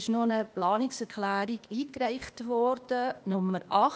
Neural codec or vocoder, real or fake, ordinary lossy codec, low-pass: codec, 16 kHz, 0.8 kbps, ZipCodec; fake; none; none